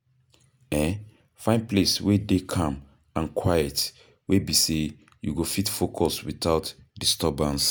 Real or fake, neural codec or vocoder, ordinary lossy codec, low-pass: real; none; none; none